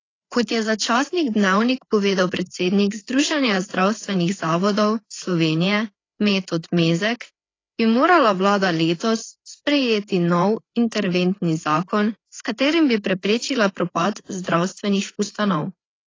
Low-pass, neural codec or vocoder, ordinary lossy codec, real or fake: 7.2 kHz; codec, 16 kHz, 4 kbps, FreqCodec, larger model; AAC, 32 kbps; fake